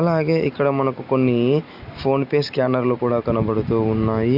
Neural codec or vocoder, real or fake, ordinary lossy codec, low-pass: none; real; Opus, 64 kbps; 5.4 kHz